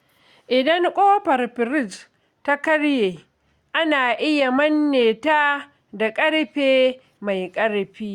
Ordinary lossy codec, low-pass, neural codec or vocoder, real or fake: Opus, 64 kbps; 19.8 kHz; none; real